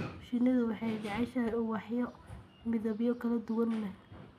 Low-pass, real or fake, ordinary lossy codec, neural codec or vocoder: 14.4 kHz; real; none; none